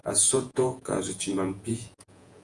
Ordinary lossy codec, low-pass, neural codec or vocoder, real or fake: Opus, 32 kbps; 10.8 kHz; vocoder, 48 kHz, 128 mel bands, Vocos; fake